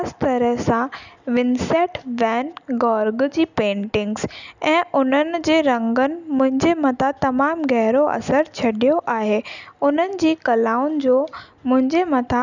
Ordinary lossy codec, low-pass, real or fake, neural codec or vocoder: none; 7.2 kHz; real; none